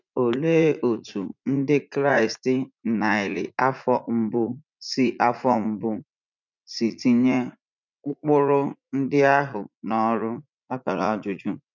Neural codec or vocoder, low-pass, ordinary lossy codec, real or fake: vocoder, 44.1 kHz, 80 mel bands, Vocos; 7.2 kHz; none; fake